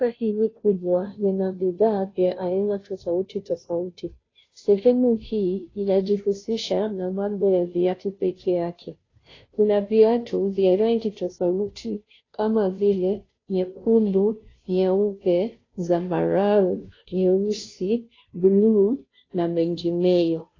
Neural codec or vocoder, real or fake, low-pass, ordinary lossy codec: codec, 16 kHz, 0.5 kbps, FunCodec, trained on Chinese and English, 25 frames a second; fake; 7.2 kHz; AAC, 32 kbps